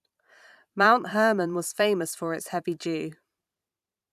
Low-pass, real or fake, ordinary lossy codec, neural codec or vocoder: 14.4 kHz; fake; none; vocoder, 44.1 kHz, 128 mel bands every 512 samples, BigVGAN v2